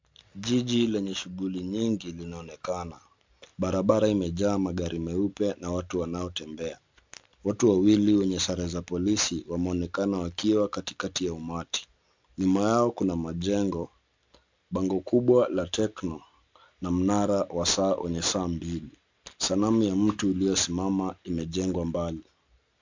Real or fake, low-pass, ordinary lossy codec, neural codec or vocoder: real; 7.2 kHz; MP3, 64 kbps; none